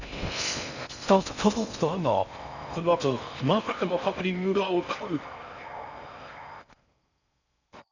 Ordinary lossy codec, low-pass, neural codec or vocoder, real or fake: none; 7.2 kHz; codec, 16 kHz in and 24 kHz out, 0.6 kbps, FocalCodec, streaming, 4096 codes; fake